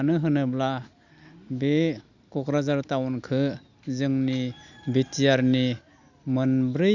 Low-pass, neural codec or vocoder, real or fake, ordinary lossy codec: 7.2 kHz; none; real; Opus, 64 kbps